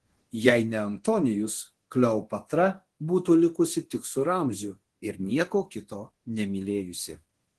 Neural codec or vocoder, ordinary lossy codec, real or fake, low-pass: codec, 24 kHz, 0.9 kbps, DualCodec; Opus, 16 kbps; fake; 10.8 kHz